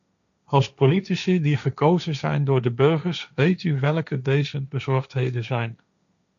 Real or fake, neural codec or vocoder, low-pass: fake; codec, 16 kHz, 1.1 kbps, Voila-Tokenizer; 7.2 kHz